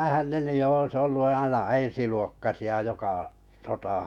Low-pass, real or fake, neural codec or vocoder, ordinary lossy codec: 19.8 kHz; fake; autoencoder, 48 kHz, 128 numbers a frame, DAC-VAE, trained on Japanese speech; none